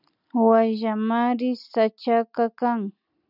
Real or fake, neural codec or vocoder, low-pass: real; none; 5.4 kHz